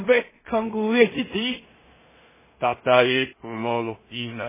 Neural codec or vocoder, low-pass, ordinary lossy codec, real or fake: codec, 16 kHz in and 24 kHz out, 0.4 kbps, LongCat-Audio-Codec, two codebook decoder; 3.6 kHz; MP3, 16 kbps; fake